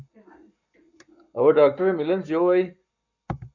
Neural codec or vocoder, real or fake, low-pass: codec, 44.1 kHz, 7.8 kbps, Pupu-Codec; fake; 7.2 kHz